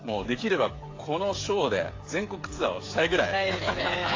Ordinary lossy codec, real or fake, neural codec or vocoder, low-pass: AAC, 32 kbps; fake; codec, 16 kHz, 8 kbps, FreqCodec, smaller model; 7.2 kHz